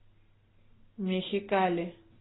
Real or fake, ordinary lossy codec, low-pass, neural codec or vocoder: real; AAC, 16 kbps; 7.2 kHz; none